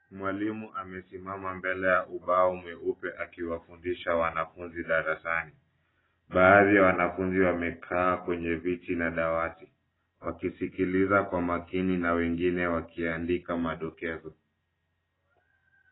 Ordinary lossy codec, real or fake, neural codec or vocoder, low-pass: AAC, 16 kbps; real; none; 7.2 kHz